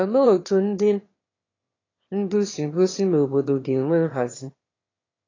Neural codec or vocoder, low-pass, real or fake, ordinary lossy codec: autoencoder, 22.05 kHz, a latent of 192 numbers a frame, VITS, trained on one speaker; 7.2 kHz; fake; AAC, 32 kbps